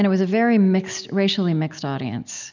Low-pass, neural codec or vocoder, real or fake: 7.2 kHz; none; real